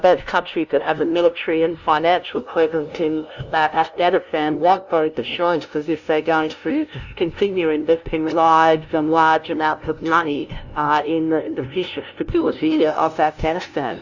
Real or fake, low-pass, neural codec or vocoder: fake; 7.2 kHz; codec, 16 kHz, 0.5 kbps, FunCodec, trained on LibriTTS, 25 frames a second